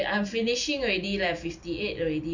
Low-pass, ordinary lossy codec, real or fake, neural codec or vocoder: 7.2 kHz; none; real; none